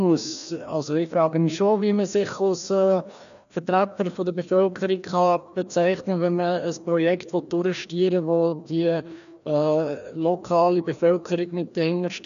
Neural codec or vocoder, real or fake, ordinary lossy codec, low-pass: codec, 16 kHz, 1 kbps, FreqCodec, larger model; fake; none; 7.2 kHz